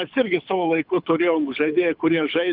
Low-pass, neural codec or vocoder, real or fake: 5.4 kHz; codec, 24 kHz, 6 kbps, HILCodec; fake